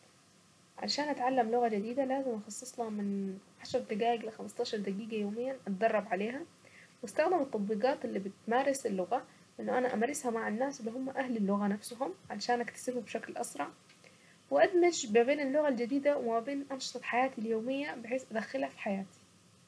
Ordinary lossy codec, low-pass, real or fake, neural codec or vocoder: none; none; real; none